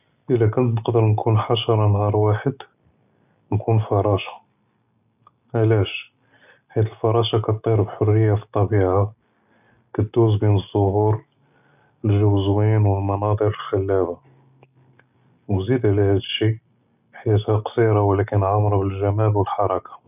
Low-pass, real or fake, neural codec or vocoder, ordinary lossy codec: 3.6 kHz; real; none; none